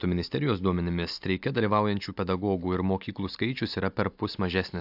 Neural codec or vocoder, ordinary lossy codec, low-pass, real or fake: none; AAC, 48 kbps; 5.4 kHz; real